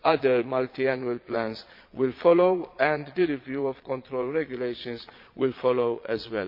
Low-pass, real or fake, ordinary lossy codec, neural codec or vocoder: 5.4 kHz; fake; MP3, 32 kbps; vocoder, 22.05 kHz, 80 mel bands, Vocos